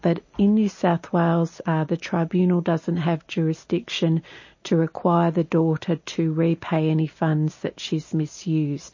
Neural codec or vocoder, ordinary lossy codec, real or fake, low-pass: none; MP3, 32 kbps; real; 7.2 kHz